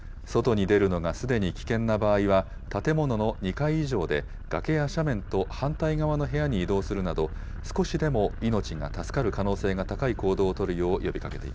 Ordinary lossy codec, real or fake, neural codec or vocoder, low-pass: none; real; none; none